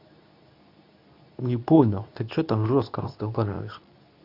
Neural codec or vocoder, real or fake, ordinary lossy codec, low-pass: codec, 24 kHz, 0.9 kbps, WavTokenizer, medium speech release version 2; fake; none; 5.4 kHz